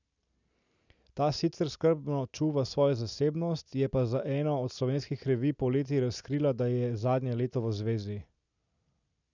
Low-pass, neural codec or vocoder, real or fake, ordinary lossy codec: 7.2 kHz; none; real; none